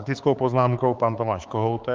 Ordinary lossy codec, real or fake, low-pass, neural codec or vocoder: Opus, 32 kbps; fake; 7.2 kHz; codec, 16 kHz, 4 kbps, X-Codec, HuBERT features, trained on balanced general audio